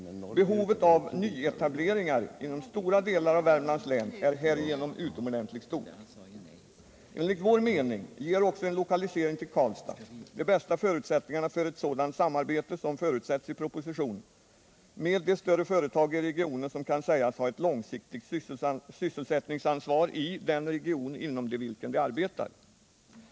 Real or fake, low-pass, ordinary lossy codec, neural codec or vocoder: real; none; none; none